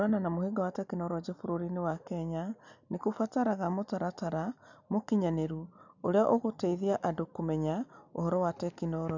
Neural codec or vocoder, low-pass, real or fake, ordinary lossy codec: none; 7.2 kHz; real; none